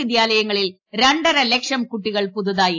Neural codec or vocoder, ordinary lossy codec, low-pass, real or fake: none; AAC, 48 kbps; 7.2 kHz; real